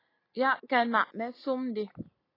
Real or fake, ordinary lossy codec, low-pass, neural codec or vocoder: real; AAC, 24 kbps; 5.4 kHz; none